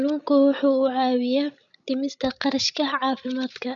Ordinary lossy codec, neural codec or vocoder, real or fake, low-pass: none; codec, 16 kHz, 16 kbps, FreqCodec, larger model; fake; 7.2 kHz